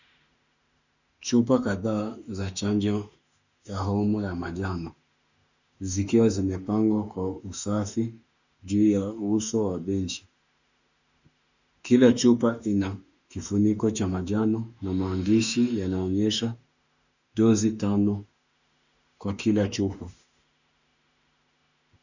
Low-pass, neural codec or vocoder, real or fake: 7.2 kHz; autoencoder, 48 kHz, 32 numbers a frame, DAC-VAE, trained on Japanese speech; fake